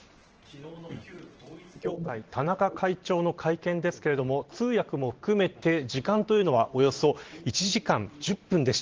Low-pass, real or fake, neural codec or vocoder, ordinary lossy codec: 7.2 kHz; fake; vocoder, 44.1 kHz, 128 mel bands every 512 samples, BigVGAN v2; Opus, 16 kbps